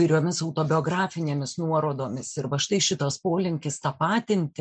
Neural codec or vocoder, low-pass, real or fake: none; 9.9 kHz; real